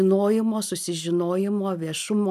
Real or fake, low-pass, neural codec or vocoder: real; 14.4 kHz; none